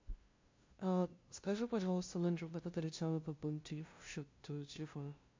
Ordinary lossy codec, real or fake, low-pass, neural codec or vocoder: MP3, 48 kbps; fake; 7.2 kHz; codec, 16 kHz, 0.5 kbps, FunCodec, trained on LibriTTS, 25 frames a second